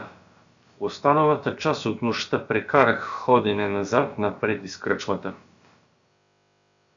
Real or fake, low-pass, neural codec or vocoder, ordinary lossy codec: fake; 7.2 kHz; codec, 16 kHz, about 1 kbps, DyCAST, with the encoder's durations; Opus, 64 kbps